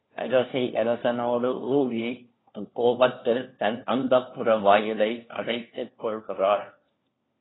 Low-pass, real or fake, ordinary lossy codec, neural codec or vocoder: 7.2 kHz; fake; AAC, 16 kbps; codec, 16 kHz, 1 kbps, FunCodec, trained on LibriTTS, 50 frames a second